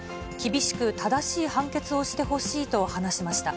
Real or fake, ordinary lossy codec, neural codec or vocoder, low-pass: real; none; none; none